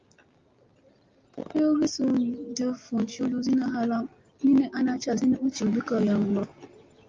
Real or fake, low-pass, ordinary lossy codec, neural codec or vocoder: real; 7.2 kHz; Opus, 32 kbps; none